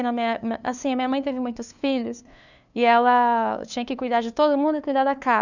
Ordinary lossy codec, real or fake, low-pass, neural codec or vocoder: none; fake; 7.2 kHz; codec, 16 kHz, 2 kbps, FunCodec, trained on LibriTTS, 25 frames a second